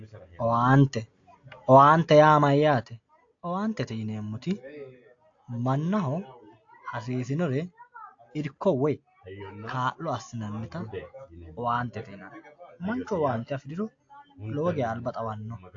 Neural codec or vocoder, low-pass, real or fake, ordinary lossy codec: none; 7.2 kHz; real; AAC, 48 kbps